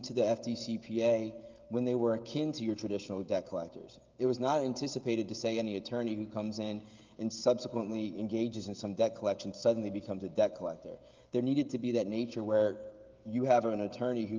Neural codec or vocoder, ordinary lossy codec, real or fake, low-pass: codec, 16 kHz, 16 kbps, FreqCodec, smaller model; Opus, 32 kbps; fake; 7.2 kHz